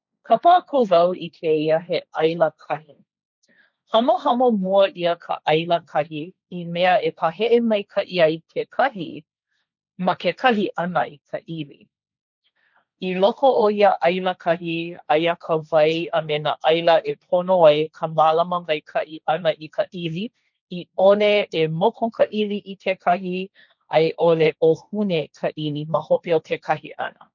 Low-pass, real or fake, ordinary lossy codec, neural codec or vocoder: 7.2 kHz; fake; none; codec, 16 kHz, 1.1 kbps, Voila-Tokenizer